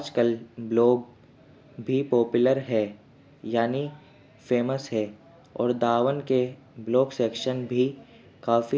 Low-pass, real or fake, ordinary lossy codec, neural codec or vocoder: none; real; none; none